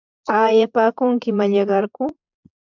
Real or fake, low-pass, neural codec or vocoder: fake; 7.2 kHz; vocoder, 22.05 kHz, 80 mel bands, Vocos